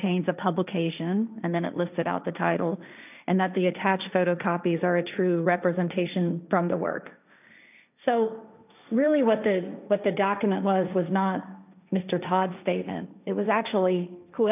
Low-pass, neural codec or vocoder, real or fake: 3.6 kHz; codec, 16 kHz, 1.1 kbps, Voila-Tokenizer; fake